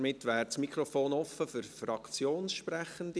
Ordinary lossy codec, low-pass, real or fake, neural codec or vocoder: none; none; real; none